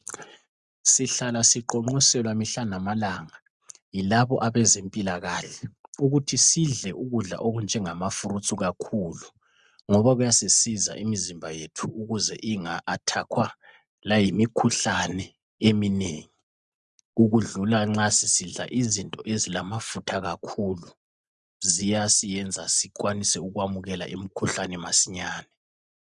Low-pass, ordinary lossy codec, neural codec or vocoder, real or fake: 10.8 kHz; Opus, 64 kbps; none; real